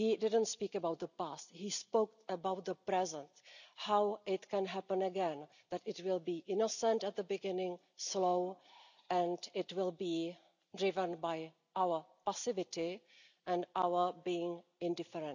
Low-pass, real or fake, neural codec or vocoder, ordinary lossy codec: 7.2 kHz; real; none; none